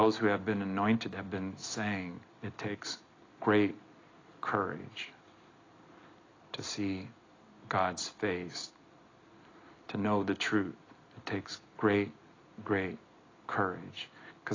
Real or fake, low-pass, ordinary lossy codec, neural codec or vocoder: real; 7.2 kHz; AAC, 32 kbps; none